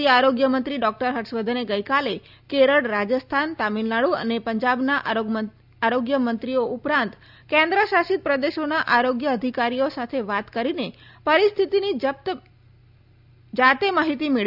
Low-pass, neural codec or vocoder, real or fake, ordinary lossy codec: 5.4 kHz; none; real; none